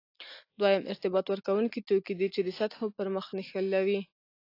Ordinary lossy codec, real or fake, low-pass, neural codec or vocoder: AAC, 32 kbps; real; 5.4 kHz; none